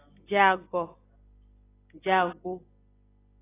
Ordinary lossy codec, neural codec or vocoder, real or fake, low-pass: AAC, 16 kbps; none; real; 3.6 kHz